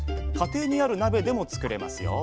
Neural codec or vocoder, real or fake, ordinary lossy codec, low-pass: none; real; none; none